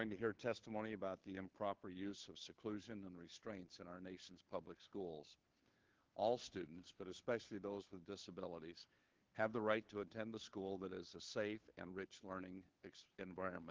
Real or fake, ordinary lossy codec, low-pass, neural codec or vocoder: fake; Opus, 16 kbps; 7.2 kHz; codec, 16 kHz, 2 kbps, FunCodec, trained on Chinese and English, 25 frames a second